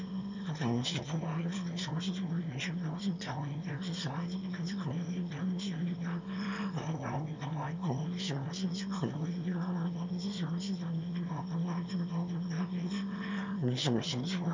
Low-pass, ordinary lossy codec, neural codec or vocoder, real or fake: 7.2 kHz; MP3, 64 kbps; autoencoder, 22.05 kHz, a latent of 192 numbers a frame, VITS, trained on one speaker; fake